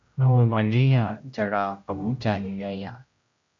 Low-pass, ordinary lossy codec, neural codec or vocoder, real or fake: 7.2 kHz; MP3, 48 kbps; codec, 16 kHz, 0.5 kbps, X-Codec, HuBERT features, trained on general audio; fake